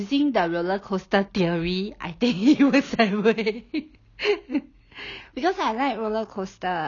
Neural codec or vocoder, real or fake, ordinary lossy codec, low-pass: none; real; none; 7.2 kHz